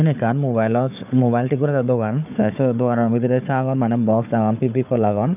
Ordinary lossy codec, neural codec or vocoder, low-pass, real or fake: none; codec, 16 kHz, 4 kbps, FunCodec, trained on Chinese and English, 50 frames a second; 3.6 kHz; fake